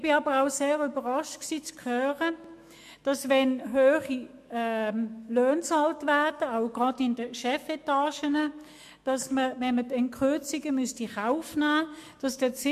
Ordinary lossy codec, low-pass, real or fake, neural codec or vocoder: MP3, 64 kbps; 14.4 kHz; fake; autoencoder, 48 kHz, 128 numbers a frame, DAC-VAE, trained on Japanese speech